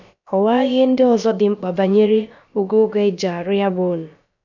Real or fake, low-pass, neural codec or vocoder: fake; 7.2 kHz; codec, 16 kHz, about 1 kbps, DyCAST, with the encoder's durations